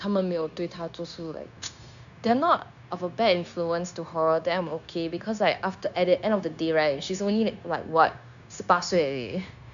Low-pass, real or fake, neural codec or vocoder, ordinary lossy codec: 7.2 kHz; fake; codec, 16 kHz, 0.9 kbps, LongCat-Audio-Codec; none